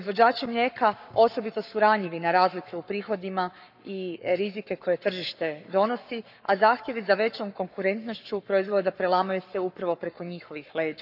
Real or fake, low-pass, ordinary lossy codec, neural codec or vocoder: fake; 5.4 kHz; none; codec, 44.1 kHz, 7.8 kbps, Pupu-Codec